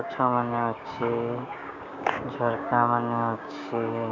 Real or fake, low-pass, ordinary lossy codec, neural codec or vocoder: fake; 7.2 kHz; MP3, 64 kbps; codec, 16 kHz, 6 kbps, DAC